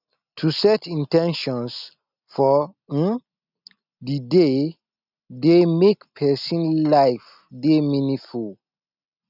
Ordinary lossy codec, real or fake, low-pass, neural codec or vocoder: none; real; 5.4 kHz; none